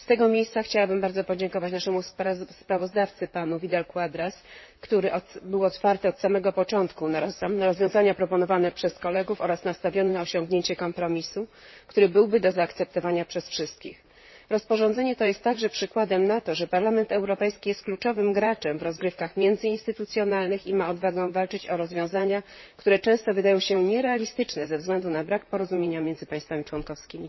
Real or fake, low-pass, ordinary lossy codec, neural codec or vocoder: fake; 7.2 kHz; MP3, 24 kbps; vocoder, 44.1 kHz, 128 mel bands, Pupu-Vocoder